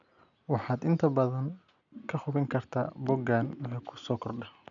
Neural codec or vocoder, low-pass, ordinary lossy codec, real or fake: none; 7.2 kHz; none; real